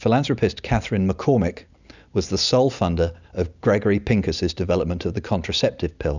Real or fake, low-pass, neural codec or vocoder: real; 7.2 kHz; none